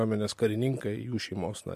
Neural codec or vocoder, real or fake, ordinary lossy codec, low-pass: none; real; MP3, 64 kbps; 14.4 kHz